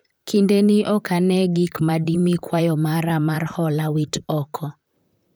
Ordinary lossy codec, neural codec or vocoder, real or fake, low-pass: none; vocoder, 44.1 kHz, 128 mel bands, Pupu-Vocoder; fake; none